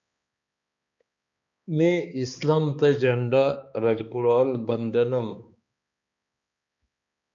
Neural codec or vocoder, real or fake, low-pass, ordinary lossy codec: codec, 16 kHz, 2 kbps, X-Codec, HuBERT features, trained on balanced general audio; fake; 7.2 kHz; MP3, 64 kbps